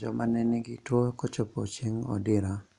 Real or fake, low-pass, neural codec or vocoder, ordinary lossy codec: real; 10.8 kHz; none; none